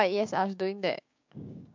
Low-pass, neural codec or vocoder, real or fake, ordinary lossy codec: 7.2 kHz; codec, 16 kHz, 6 kbps, DAC; fake; MP3, 48 kbps